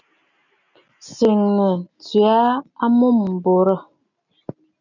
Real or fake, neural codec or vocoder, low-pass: real; none; 7.2 kHz